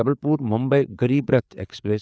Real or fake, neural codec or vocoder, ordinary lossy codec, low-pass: fake; codec, 16 kHz, 4 kbps, FreqCodec, larger model; none; none